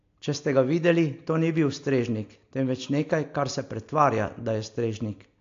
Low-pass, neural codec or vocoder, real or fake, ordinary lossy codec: 7.2 kHz; none; real; AAC, 48 kbps